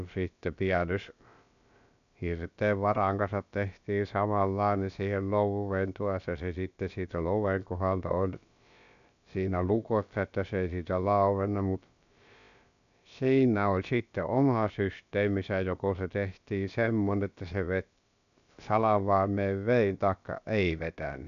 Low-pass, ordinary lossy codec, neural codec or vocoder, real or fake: 7.2 kHz; none; codec, 16 kHz, about 1 kbps, DyCAST, with the encoder's durations; fake